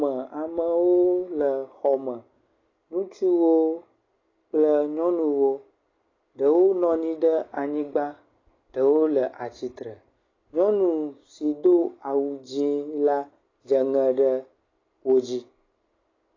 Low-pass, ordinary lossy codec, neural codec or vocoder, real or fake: 7.2 kHz; AAC, 32 kbps; none; real